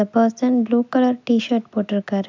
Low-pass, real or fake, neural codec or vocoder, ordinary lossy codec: 7.2 kHz; real; none; MP3, 64 kbps